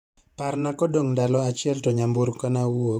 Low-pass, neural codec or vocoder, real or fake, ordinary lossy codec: 19.8 kHz; vocoder, 48 kHz, 128 mel bands, Vocos; fake; none